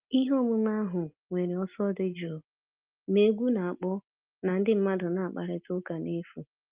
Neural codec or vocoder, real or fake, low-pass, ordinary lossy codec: none; real; 3.6 kHz; Opus, 32 kbps